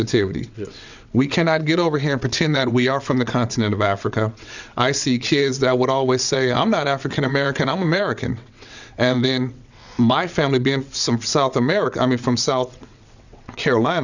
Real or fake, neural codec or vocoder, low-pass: fake; vocoder, 22.05 kHz, 80 mel bands, WaveNeXt; 7.2 kHz